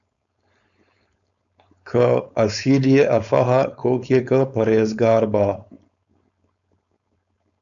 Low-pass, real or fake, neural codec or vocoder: 7.2 kHz; fake; codec, 16 kHz, 4.8 kbps, FACodec